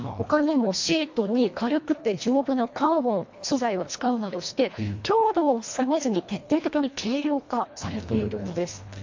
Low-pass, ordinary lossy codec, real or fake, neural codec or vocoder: 7.2 kHz; MP3, 48 kbps; fake; codec, 24 kHz, 1.5 kbps, HILCodec